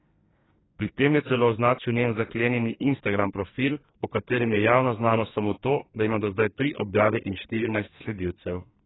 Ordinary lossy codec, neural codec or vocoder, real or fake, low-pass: AAC, 16 kbps; codec, 44.1 kHz, 2.6 kbps, SNAC; fake; 7.2 kHz